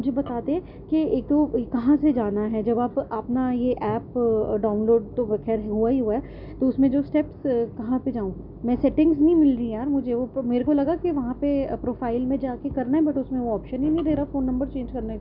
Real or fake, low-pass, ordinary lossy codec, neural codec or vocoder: real; 5.4 kHz; none; none